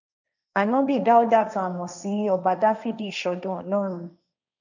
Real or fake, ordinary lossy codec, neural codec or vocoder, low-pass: fake; none; codec, 16 kHz, 1.1 kbps, Voila-Tokenizer; 7.2 kHz